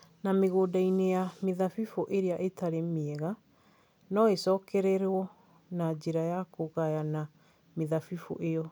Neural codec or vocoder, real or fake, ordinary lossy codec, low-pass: none; real; none; none